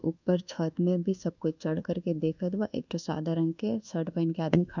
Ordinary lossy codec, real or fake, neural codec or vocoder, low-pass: none; fake; codec, 24 kHz, 1.2 kbps, DualCodec; 7.2 kHz